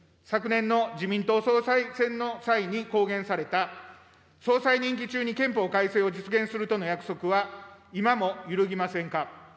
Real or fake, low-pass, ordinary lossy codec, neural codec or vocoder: real; none; none; none